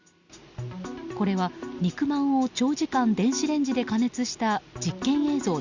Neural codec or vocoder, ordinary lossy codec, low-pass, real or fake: none; Opus, 64 kbps; 7.2 kHz; real